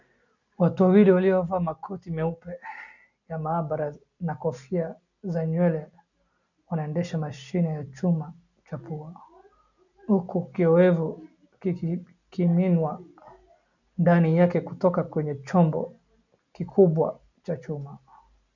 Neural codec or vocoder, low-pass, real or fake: none; 7.2 kHz; real